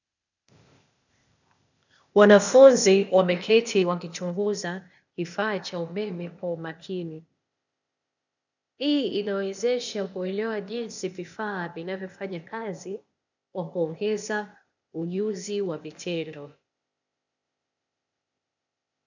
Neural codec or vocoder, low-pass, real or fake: codec, 16 kHz, 0.8 kbps, ZipCodec; 7.2 kHz; fake